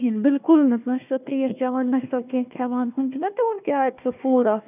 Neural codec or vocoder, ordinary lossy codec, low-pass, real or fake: codec, 16 kHz, 1 kbps, FunCodec, trained on Chinese and English, 50 frames a second; none; 3.6 kHz; fake